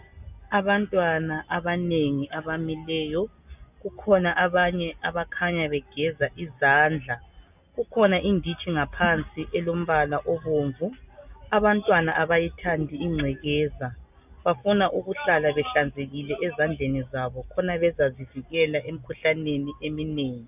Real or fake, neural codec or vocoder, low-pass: real; none; 3.6 kHz